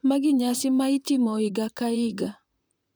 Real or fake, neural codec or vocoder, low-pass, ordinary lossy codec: fake; vocoder, 44.1 kHz, 128 mel bands, Pupu-Vocoder; none; none